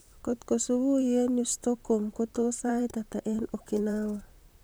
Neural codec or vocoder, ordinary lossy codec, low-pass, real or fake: vocoder, 44.1 kHz, 128 mel bands, Pupu-Vocoder; none; none; fake